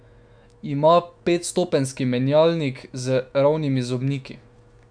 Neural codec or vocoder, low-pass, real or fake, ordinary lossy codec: autoencoder, 48 kHz, 128 numbers a frame, DAC-VAE, trained on Japanese speech; 9.9 kHz; fake; none